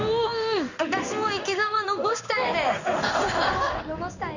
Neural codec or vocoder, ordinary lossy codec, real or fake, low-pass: codec, 16 kHz in and 24 kHz out, 1 kbps, XY-Tokenizer; none; fake; 7.2 kHz